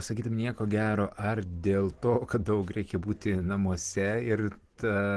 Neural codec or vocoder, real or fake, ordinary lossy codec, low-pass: none; real; Opus, 16 kbps; 10.8 kHz